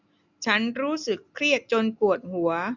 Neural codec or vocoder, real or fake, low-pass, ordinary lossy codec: none; real; 7.2 kHz; none